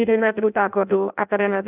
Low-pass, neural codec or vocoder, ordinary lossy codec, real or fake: 3.6 kHz; codec, 16 kHz in and 24 kHz out, 0.6 kbps, FireRedTTS-2 codec; none; fake